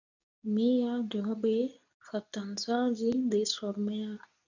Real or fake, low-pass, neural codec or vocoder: fake; 7.2 kHz; codec, 24 kHz, 0.9 kbps, WavTokenizer, medium speech release version 2